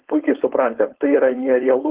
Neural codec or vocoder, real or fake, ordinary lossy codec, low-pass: codec, 16 kHz, 4.8 kbps, FACodec; fake; Opus, 16 kbps; 3.6 kHz